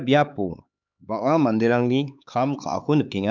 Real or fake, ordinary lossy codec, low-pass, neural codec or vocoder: fake; none; 7.2 kHz; codec, 16 kHz, 4 kbps, X-Codec, HuBERT features, trained on LibriSpeech